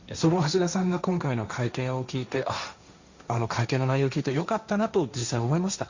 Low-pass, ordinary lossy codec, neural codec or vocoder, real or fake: 7.2 kHz; Opus, 64 kbps; codec, 16 kHz, 1.1 kbps, Voila-Tokenizer; fake